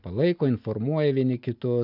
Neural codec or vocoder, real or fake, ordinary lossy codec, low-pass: none; real; AAC, 48 kbps; 5.4 kHz